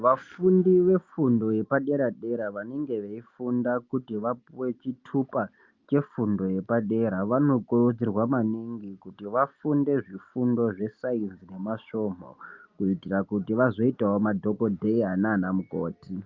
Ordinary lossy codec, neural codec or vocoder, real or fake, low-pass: Opus, 24 kbps; none; real; 7.2 kHz